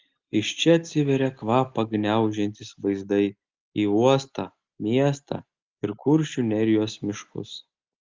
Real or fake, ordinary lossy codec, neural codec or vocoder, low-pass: real; Opus, 32 kbps; none; 7.2 kHz